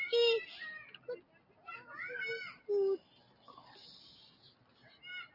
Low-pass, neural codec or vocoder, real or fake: 5.4 kHz; none; real